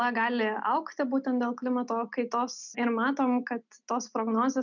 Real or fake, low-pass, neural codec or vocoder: real; 7.2 kHz; none